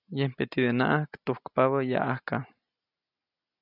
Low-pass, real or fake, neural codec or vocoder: 5.4 kHz; real; none